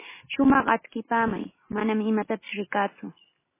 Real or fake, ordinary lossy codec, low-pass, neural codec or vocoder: real; MP3, 16 kbps; 3.6 kHz; none